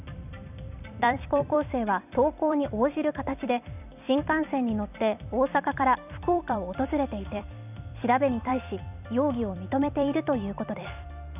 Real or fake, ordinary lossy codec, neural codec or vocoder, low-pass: fake; none; vocoder, 44.1 kHz, 128 mel bands every 256 samples, BigVGAN v2; 3.6 kHz